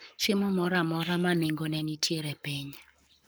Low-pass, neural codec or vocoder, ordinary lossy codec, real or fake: none; codec, 44.1 kHz, 7.8 kbps, Pupu-Codec; none; fake